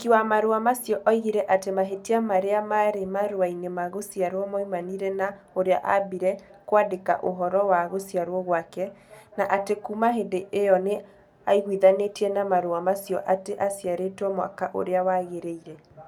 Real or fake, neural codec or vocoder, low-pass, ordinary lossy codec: real; none; 19.8 kHz; none